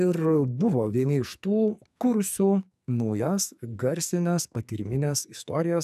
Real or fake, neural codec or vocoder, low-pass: fake; codec, 44.1 kHz, 2.6 kbps, SNAC; 14.4 kHz